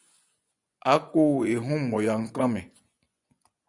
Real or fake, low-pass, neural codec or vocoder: fake; 10.8 kHz; vocoder, 24 kHz, 100 mel bands, Vocos